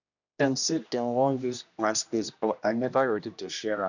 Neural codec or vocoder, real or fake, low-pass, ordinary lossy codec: codec, 16 kHz, 1 kbps, X-Codec, HuBERT features, trained on general audio; fake; 7.2 kHz; none